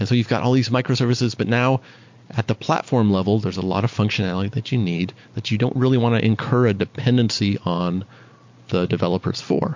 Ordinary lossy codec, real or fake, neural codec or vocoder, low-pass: MP3, 48 kbps; real; none; 7.2 kHz